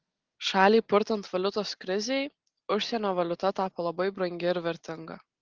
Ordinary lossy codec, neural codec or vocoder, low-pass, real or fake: Opus, 16 kbps; none; 7.2 kHz; real